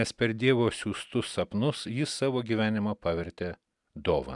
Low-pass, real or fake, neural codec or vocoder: 10.8 kHz; real; none